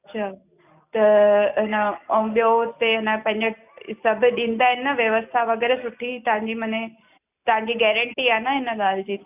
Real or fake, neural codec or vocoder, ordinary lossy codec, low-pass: real; none; none; 3.6 kHz